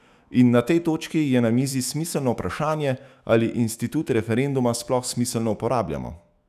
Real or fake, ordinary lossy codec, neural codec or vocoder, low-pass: fake; none; autoencoder, 48 kHz, 128 numbers a frame, DAC-VAE, trained on Japanese speech; 14.4 kHz